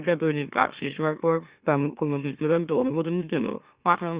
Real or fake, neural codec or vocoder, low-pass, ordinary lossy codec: fake; autoencoder, 44.1 kHz, a latent of 192 numbers a frame, MeloTTS; 3.6 kHz; Opus, 64 kbps